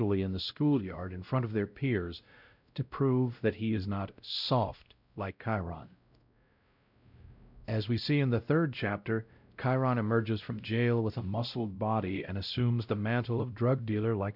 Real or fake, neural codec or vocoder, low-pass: fake; codec, 16 kHz, 0.5 kbps, X-Codec, WavLM features, trained on Multilingual LibriSpeech; 5.4 kHz